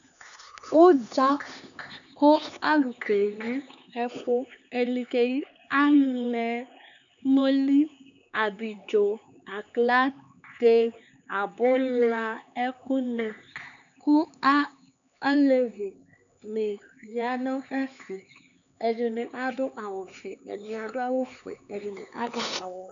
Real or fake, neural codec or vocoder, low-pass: fake; codec, 16 kHz, 4 kbps, X-Codec, HuBERT features, trained on LibriSpeech; 7.2 kHz